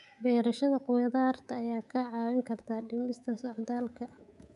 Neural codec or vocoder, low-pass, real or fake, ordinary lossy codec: codec, 24 kHz, 3.1 kbps, DualCodec; 10.8 kHz; fake; none